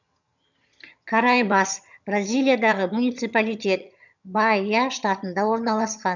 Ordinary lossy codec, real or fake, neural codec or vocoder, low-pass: none; fake; vocoder, 22.05 kHz, 80 mel bands, HiFi-GAN; 7.2 kHz